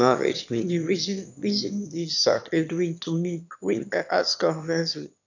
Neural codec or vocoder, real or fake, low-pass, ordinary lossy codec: autoencoder, 22.05 kHz, a latent of 192 numbers a frame, VITS, trained on one speaker; fake; 7.2 kHz; none